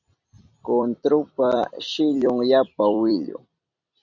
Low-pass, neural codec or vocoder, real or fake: 7.2 kHz; vocoder, 44.1 kHz, 128 mel bands every 256 samples, BigVGAN v2; fake